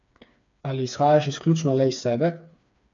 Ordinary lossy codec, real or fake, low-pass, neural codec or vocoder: AAC, 64 kbps; fake; 7.2 kHz; codec, 16 kHz, 4 kbps, FreqCodec, smaller model